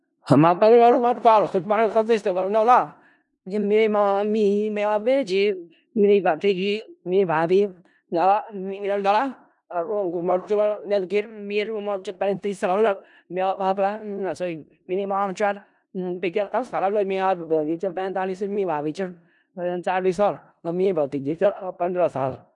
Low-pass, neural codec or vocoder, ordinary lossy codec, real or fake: 10.8 kHz; codec, 16 kHz in and 24 kHz out, 0.4 kbps, LongCat-Audio-Codec, four codebook decoder; none; fake